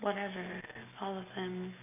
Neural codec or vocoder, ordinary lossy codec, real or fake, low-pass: none; none; real; 3.6 kHz